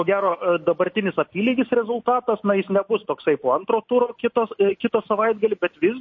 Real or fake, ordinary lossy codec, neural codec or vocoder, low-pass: real; MP3, 32 kbps; none; 7.2 kHz